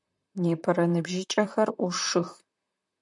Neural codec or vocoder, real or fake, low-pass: vocoder, 44.1 kHz, 128 mel bands, Pupu-Vocoder; fake; 10.8 kHz